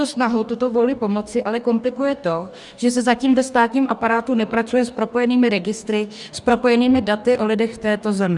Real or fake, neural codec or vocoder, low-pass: fake; codec, 44.1 kHz, 2.6 kbps, DAC; 10.8 kHz